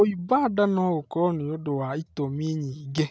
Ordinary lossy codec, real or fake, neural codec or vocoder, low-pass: none; real; none; none